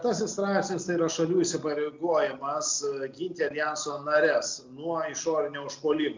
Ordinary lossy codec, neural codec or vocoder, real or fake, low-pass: MP3, 64 kbps; none; real; 7.2 kHz